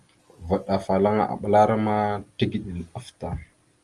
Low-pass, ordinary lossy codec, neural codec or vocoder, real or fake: 10.8 kHz; Opus, 32 kbps; none; real